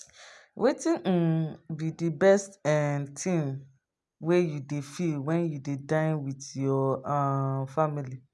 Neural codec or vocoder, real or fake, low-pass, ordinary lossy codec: none; real; none; none